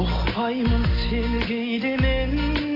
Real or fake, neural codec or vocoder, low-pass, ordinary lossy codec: real; none; 5.4 kHz; AAC, 24 kbps